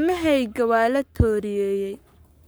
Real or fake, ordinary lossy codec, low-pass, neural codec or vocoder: fake; none; none; codec, 44.1 kHz, 7.8 kbps, Pupu-Codec